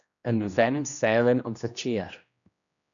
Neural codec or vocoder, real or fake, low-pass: codec, 16 kHz, 1 kbps, X-Codec, HuBERT features, trained on general audio; fake; 7.2 kHz